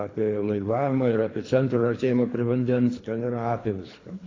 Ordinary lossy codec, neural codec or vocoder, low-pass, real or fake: AAC, 32 kbps; codec, 24 kHz, 3 kbps, HILCodec; 7.2 kHz; fake